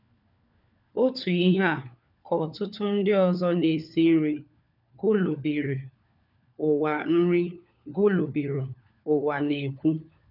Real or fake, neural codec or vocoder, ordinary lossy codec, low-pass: fake; codec, 16 kHz, 4 kbps, FunCodec, trained on LibriTTS, 50 frames a second; none; 5.4 kHz